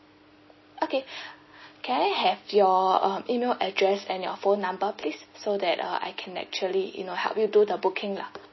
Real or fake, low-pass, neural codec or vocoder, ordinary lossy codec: real; 7.2 kHz; none; MP3, 24 kbps